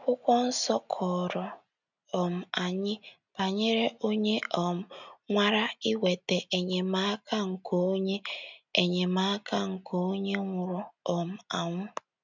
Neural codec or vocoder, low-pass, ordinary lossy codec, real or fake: none; 7.2 kHz; none; real